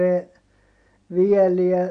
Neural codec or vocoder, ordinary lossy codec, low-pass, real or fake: none; none; 9.9 kHz; real